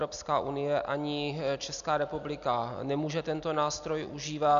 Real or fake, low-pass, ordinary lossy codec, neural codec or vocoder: real; 7.2 kHz; MP3, 96 kbps; none